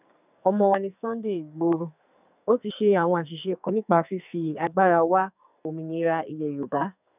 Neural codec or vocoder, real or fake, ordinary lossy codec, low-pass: codec, 44.1 kHz, 2.6 kbps, SNAC; fake; none; 3.6 kHz